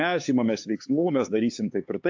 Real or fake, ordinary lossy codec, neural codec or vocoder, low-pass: fake; AAC, 48 kbps; codec, 16 kHz, 4 kbps, X-Codec, HuBERT features, trained on LibriSpeech; 7.2 kHz